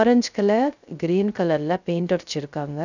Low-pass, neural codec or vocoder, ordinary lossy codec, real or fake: 7.2 kHz; codec, 16 kHz, 0.3 kbps, FocalCodec; none; fake